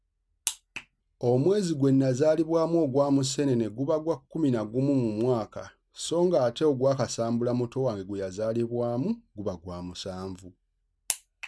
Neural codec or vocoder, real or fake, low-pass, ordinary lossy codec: none; real; none; none